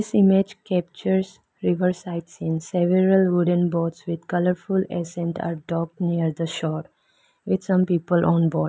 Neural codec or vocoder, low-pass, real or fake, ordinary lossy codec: none; none; real; none